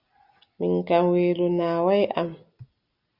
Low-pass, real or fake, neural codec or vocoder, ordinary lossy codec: 5.4 kHz; real; none; Opus, 64 kbps